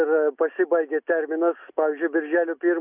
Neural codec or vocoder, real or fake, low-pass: none; real; 3.6 kHz